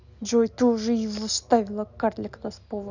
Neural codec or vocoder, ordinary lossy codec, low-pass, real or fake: none; none; 7.2 kHz; real